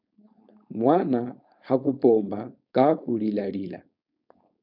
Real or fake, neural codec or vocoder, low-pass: fake; codec, 16 kHz, 4.8 kbps, FACodec; 5.4 kHz